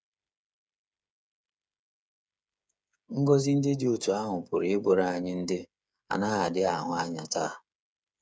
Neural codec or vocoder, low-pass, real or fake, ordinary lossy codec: codec, 16 kHz, 8 kbps, FreqCodec, smaller model; none; fake; none